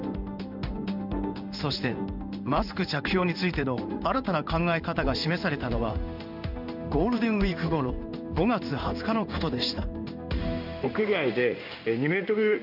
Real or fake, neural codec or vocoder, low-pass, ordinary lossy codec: fake; codec, 16 kHz in and 24 kHz out, 1 kbps, XY-Tokenizer; 5.4 kHz; none